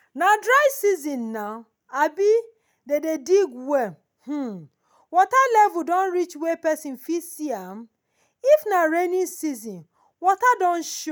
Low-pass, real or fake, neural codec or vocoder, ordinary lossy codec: none; real; none; none